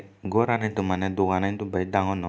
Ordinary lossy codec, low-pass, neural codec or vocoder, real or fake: none; none; none; real